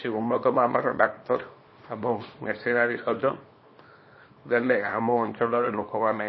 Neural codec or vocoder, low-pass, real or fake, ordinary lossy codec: codec, 24 kHz, 0.9 kbps, WavTokenizer, small release; 7.2 kHz; fake; MP3, 24 kbps